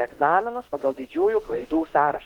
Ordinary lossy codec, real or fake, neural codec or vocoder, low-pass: Opus, 16 kbps; fake; autoencoder, 48 kHz, 32 numbers a frame, DAC-VAE, trained on Japanese speech; 19.8 kHz